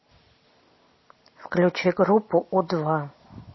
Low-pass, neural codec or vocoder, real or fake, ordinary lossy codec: 7.2 kHz; none; real; MP3, 24 kbps